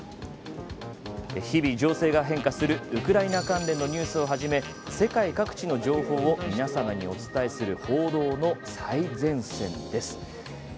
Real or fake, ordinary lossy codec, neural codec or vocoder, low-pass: real; none; none; none